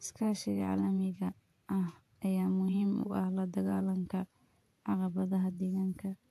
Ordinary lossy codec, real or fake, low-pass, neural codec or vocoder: AAC, 64 kbps; fake; 14.4 kHz; vocoder, 48 kHz, 128 mel bands, Vocos